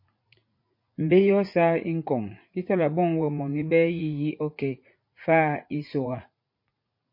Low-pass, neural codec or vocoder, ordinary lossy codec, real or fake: 5.4 kHz; vocoder, 44.1 kHz, 80 mel bands, Vocos; MP3, 32 kbps; fake